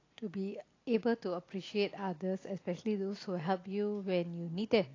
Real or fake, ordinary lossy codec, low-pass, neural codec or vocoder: real; AAC, 32 kbps; 7.2 kHz; none